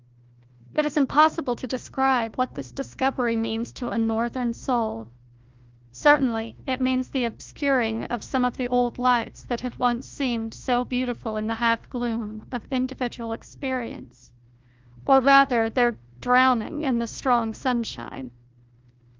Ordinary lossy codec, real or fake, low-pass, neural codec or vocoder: Opus, 32 kbps; fake; 7.2 kHz; codec, 16 kHz, 1 kbps, FunCodec, trained on Chinese and English, 50 frames a second